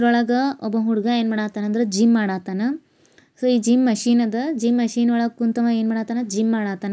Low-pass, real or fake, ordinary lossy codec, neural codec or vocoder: none; real; none; none